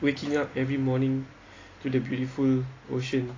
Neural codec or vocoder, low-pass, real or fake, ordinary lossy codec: none; 7.2 kHz; real; AAC, 32 kbps